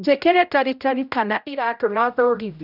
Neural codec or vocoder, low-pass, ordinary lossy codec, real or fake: codec, 16 kHz, 0.5 kbps, X-Codec, HuBERT features, trained on general audio; 5.4 kHz; none; fake